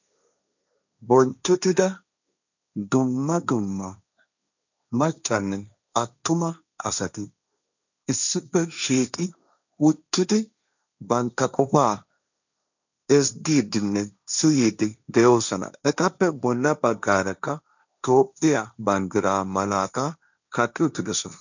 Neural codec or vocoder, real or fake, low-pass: codec, 16 kHz, 1.1 kbps, Voila-Tokenizer; fake; 7.2 kHz